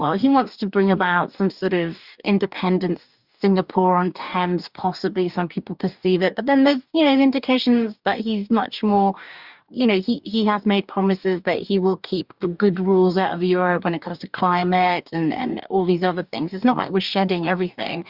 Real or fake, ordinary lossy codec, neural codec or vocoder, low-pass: fake; Opus, 64 kbps; codec, 44.1 kHz, 2.6 kbps, DAC; 5.4 kHz